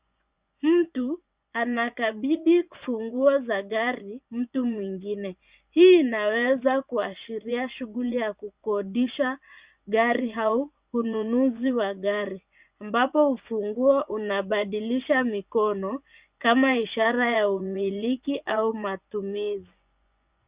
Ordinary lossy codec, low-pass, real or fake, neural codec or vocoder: Opus, 64 kbps; 3.6 kHz; fake; vocoder, 24 kHz, 100 mel bands, Vocos